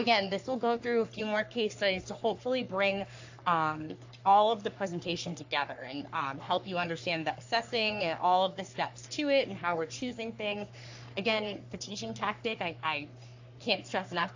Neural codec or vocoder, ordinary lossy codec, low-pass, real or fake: codec, 44.1 kHz, 3.4 kbps, Pupu-Codec; AAC, 48 kbps; 7.2 kHz; fake